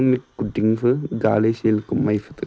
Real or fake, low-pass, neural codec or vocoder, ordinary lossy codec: real; none; none; none